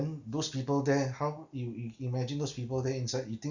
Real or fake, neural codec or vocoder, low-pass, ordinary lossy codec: real; none; 7.2 kHz; Opus, 64 kbps